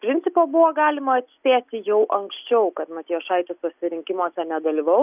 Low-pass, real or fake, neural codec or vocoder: 3.6 kHz; real; none